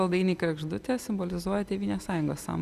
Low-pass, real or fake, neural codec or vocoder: 14.4 kHz; real; none